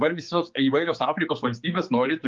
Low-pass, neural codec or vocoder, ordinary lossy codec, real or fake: 7.2 kHz; codec, 16 kHz, 4 kbps, X-Codec, HuBERT features, trained on general audio; Opus, 32 kbps; fake